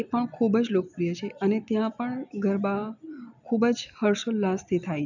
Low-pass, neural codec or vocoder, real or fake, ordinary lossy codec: 7.2 kHz; none; real; none